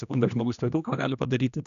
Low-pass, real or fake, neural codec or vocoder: 7.2 kHz; fake; codec, 16 kHz, 1 kbps, X-Codec, HuBERT features, trained on general audio